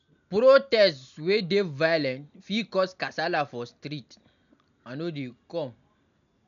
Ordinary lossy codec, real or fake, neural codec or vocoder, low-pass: none; real; none; 7.2 kHz